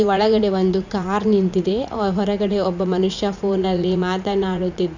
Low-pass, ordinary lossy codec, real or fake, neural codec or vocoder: 7.2 kHz; none; fake; vocoder, 44.1 kHz, 80 mel bands, Vocos